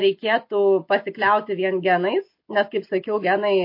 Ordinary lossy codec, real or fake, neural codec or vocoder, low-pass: MP3, 32 kbps; real; none; 5.4 kHz